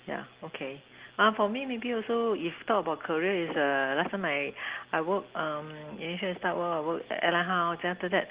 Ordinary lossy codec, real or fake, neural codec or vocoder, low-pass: Opus, 16 kbps; real; none; 3.6 kHz